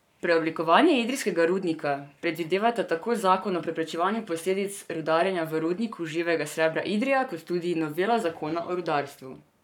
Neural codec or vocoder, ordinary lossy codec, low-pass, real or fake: codec, 44.1 kHz, 7.8 kbps, Pupu-Codec; none; 19.8 kHz; fake